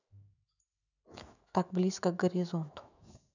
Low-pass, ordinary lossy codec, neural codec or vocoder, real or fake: 7.2 kHz; none; autoencoder, 48 kHz, 128 numbers a frame, DAC-VAE, trained on Japanese speech; fake